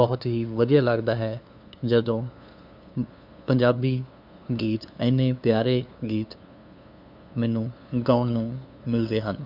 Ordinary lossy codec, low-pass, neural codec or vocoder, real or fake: none; 5.4 kHz; codec, 16 kHz, 2 kbps, FunCodec, trained on LibriTTS, 25 frames a second; fake